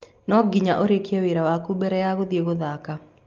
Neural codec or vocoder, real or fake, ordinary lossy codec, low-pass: none; real; Opus, 32 kbps; 7.2 kHz